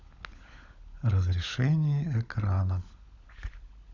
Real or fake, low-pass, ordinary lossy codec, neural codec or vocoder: fake; 7.2 kHz; none; codec, 16 kHz, 16 kbps, FunCodec, trained on LibriTTS, 50 frames a second